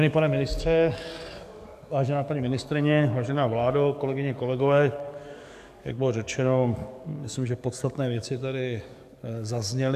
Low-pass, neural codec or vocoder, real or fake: 14.4 kHz; codec, 44.1 kHz, 7.8 kbps, DAC; fake